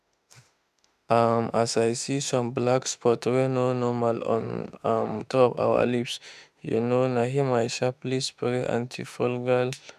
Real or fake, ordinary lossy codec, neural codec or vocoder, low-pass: fake; none; autoencoder, 48 kHz, 32 numbers a frame, DAC-VAE, trained on Japanese speech; 14.4 kHz